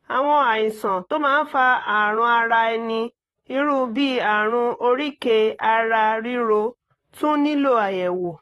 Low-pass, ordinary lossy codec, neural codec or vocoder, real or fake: 19.8 kHz; AAC, 32 kbps; vocoder, 44.1 kHz, 128 mel bands, Pupu-Vocoder; fake